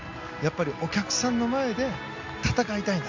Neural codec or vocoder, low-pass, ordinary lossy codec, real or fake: none; 7.2 kHz; MP3, 64 kbps; real